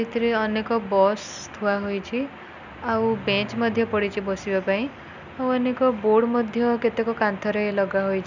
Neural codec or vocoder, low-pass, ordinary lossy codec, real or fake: none; 7.2 kHz; none; real